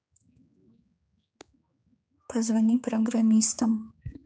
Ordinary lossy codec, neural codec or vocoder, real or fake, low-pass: none; codec, 16 kHz, 4 kbps, X-Codec, HuBERT features, trained on general audio; fake; none